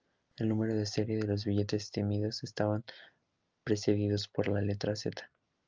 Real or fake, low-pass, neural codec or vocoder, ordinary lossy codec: real; 7.2 kHz; none; Opus, 24 kbps